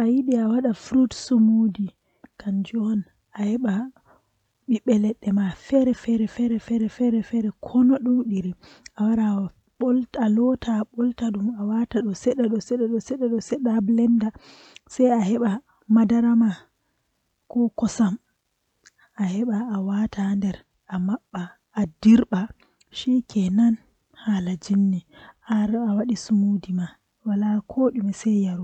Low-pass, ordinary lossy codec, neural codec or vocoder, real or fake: 19.8 kHz; none; none; real